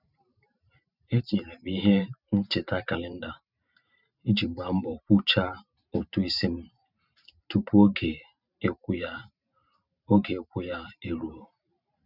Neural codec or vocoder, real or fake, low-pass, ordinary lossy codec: vocoder, 44.1 kHz, 128 mel bands every 512 samples, BigVGAN v2; fake; 5.4 kHz; none